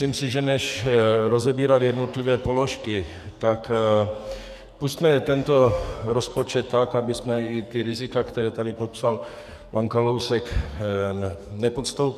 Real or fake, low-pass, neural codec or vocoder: fake; 14.4 kHz; codec, 44.1 kHz, 2.6 kbps, SNAC